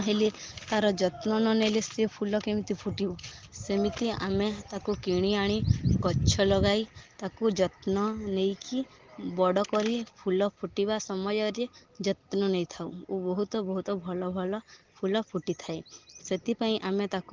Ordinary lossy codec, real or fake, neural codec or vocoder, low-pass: Opus, 16 kbps; real; none; 7.2 kHz